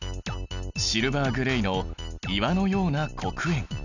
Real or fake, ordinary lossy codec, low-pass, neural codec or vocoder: real; none; 7.2 kHz; none